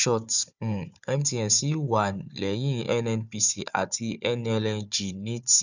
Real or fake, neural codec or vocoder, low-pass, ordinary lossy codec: fake; codec, 16 kHz, 4 kbps, FunCodec, trained on Chinese and English, 50 frames a second; 7.2 kHz; none